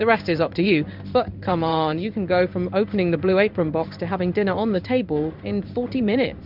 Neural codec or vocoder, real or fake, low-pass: codec, 16 kHz in and 24 kHz out, 1 kbps, XY-Tokenizer; fake; 5.4 kHz